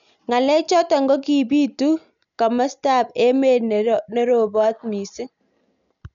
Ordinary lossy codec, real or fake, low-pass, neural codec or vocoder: none; real; 7.2 kHz; none